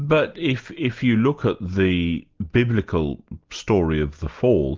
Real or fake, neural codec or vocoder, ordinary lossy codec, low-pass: real; none; Opus, 32 kbps; 7.2 kHz